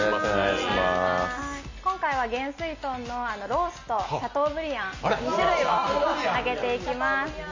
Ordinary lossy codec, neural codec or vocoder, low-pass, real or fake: MP3, 32 kbps; none; 7.2 kHz; real